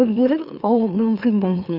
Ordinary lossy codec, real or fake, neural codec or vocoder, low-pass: none; fake; autoencoder, 44.1 kHz, a latent of 192 numbers a frame, MeloTTS; 5.4 kHz